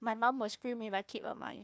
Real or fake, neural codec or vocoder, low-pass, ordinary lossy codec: fake; codec, 16 kHz, 1 kbps, FunCodec, trained on Chinese and English, 50 frames a second; none; none